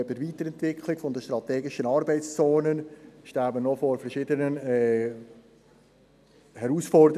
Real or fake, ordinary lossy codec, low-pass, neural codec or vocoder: real; none; 14.4 kHz; none